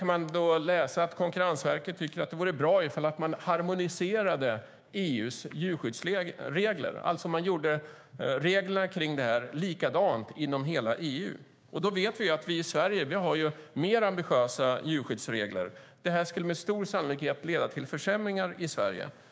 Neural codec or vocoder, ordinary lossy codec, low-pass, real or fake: codec, 16 kHz, 6 kbps, DAC; none; none; fake